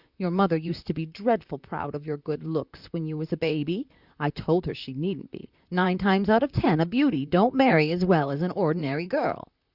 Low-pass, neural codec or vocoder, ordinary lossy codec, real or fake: 5.4 kHz; vocoder, 44.1 kHz, 128 mel bands, Pupu-Vocoder; Opus, 64 kbps; fake